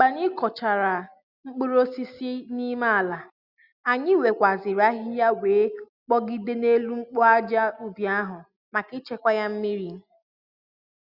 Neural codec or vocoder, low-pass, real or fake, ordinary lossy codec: none; 5.4 kHz; real; Opus, 64 kbps